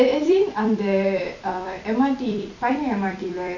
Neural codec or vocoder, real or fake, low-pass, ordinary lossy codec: vocoder, 44.1 kHz, 128 mel bands every 256 samples, BigVGAN v2; fake; 7.2 kHz; none